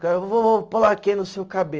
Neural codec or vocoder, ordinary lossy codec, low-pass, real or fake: none; Opus, 24 kbps; 7.2 kHz; real